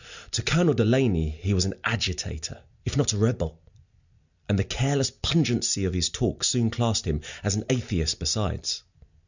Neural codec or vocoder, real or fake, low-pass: none; real; 7.2 kHz